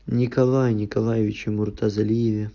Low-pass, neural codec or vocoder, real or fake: 7.2 kHz; none; real